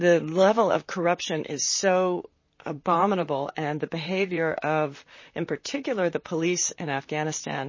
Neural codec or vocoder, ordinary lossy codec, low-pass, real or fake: vocoder, 44.1 kHz, 128 mel bands, Pupu-Vocoder; MP3, 32 kbps; 7.2 kHz; fake